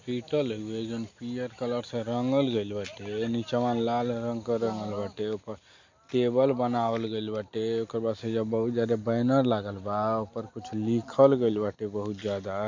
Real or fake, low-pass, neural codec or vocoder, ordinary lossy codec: real; 7.2 kHz; none; MP3, 48 kbps